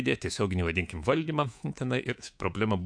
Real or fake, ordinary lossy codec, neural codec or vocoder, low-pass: fake; AAC, 64 kbps; codec, 24 kHz, 3.1 kbps, DualCodec; 9.9 kHz